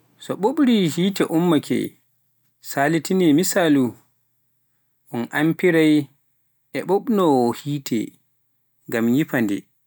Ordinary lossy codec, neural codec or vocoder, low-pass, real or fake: none; none; none; real